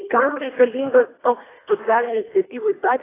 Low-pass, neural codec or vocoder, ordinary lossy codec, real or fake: 3.6 kHz; codec, 24 kHz, 1.5 kbps, HILCodec; AAC, 16 kbps; fake